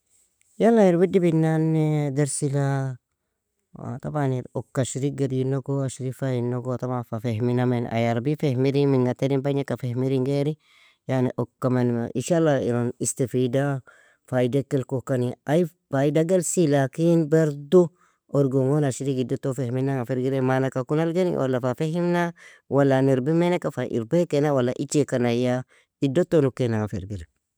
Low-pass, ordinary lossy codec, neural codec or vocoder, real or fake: none; none; none; real